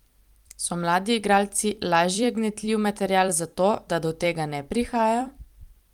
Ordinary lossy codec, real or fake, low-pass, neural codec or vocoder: Opus, 32 kbps; fake; 19.8 kHz; vocoder, 44.1 kHz, 128 mel bands every 256 samples, BigVGAN v2